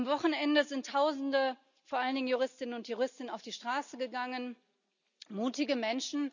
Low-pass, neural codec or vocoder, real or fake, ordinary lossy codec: 7.2 kHz; none; real; none